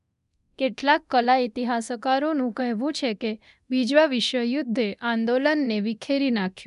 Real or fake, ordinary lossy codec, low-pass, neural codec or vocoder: fake; none; 10.8 kHz; codec, 24 kHz, 0.9 kbps, DualCodec